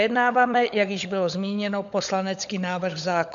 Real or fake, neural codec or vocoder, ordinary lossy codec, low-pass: fake; codec, 16 kHz, 16 kbps, FunCodec, trained on LibriTTS, 50 frames a second; MP3, 64 kbps; 7.2 kHz